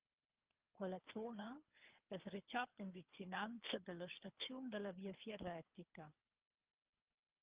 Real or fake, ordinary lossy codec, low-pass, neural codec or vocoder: fake; Opus, 24 kbps; 3.6 kHz; codec, 24 kHz, 3 kbps, HILCodec